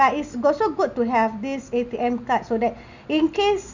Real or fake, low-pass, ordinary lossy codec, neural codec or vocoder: real; 7.2 kHz; none; none